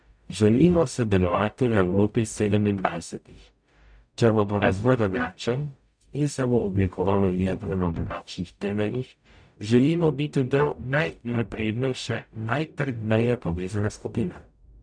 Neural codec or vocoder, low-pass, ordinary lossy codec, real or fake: codec, 44.1 kHz, 0.9 kbps, DAC; 9.9 kHz; none; fake